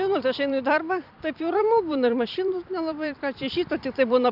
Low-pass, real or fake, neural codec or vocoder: 5.4 kHz; fake; vocoder, 44.1 kHz, 128 mel bands every 256 samples, BigVGAN v2